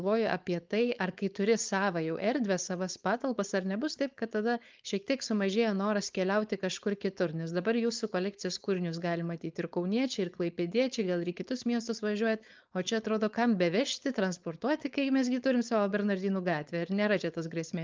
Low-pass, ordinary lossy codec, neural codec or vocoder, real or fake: 7.2 kHz; Opus, 32 kbps; codec, 16 kHz, 4.8 kbps, FACodec; fake